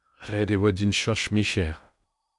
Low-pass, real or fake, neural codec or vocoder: 10.8 kHz; fake; codec, 16 kHz in and 24 kHz out, 0.6 kbps, FocalCodec, streaming, 2048 codes